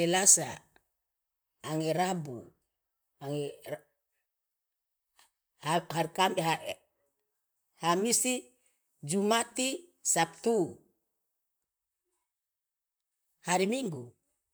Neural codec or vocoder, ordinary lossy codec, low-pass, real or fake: vocoder, 44.1 kHz, 128 mel bands, Pupu-Vocoder; none; none; fake